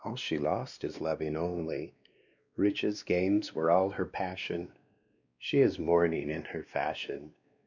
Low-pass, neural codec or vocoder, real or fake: 7.2 kHz; codec, 16 kHz, 2 kbps, X-Codec, WavLM features, trained on Multilingual LibriSpeech; fake